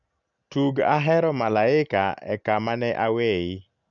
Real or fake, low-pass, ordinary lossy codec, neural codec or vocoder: real; 7.2 kHz; none; none